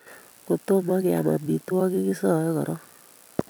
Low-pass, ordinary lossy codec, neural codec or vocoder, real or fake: none; none; vocoder, 44.1 kHz, 128 mel bands every 256 samples, BigVGAN v2; fake